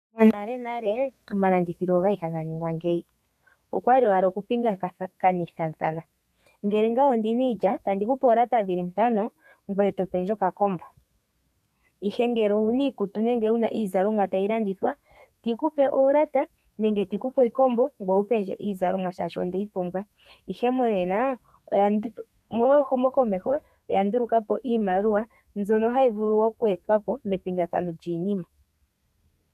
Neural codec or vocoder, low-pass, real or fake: codec, 32 kHz, 1.9 kbps, SNAC; 14.4 kHz; fake